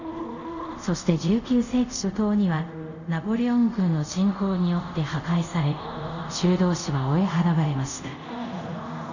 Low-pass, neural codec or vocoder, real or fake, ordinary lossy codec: 7.2 kHz; codec, 24 kHz, 0.5 kbps, DualCodec; fake; none